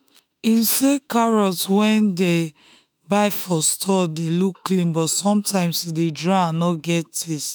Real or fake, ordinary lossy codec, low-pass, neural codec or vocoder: fake; none; none; autoencoder, 48 kHz, 32 numbers a frame, DAC-VAE, trained on Japanese speech